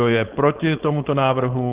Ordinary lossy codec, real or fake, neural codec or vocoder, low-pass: Opus, 16 kbps; fake; codec, 16 kHz, 4.8 kbps, FACodec; 3.6 kHz